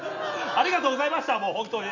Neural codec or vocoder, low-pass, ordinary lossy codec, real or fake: none; 7.2 kHz; none; real